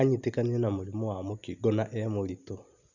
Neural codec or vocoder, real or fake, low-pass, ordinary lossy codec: none; real; 7.2 kHz; none